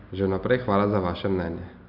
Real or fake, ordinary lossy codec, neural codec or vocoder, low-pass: real; none; none; 5.4 kHz